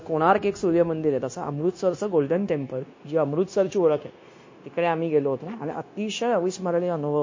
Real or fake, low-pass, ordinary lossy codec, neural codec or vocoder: fake; 7.2 kHz; MP3, 32 kbps; codec, 16 kHz, 0.9 kbps, LongCat-Audio-Codec